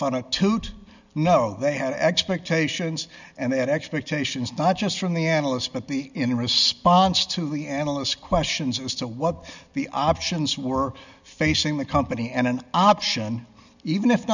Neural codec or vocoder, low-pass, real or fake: none; 7.2 kHz; real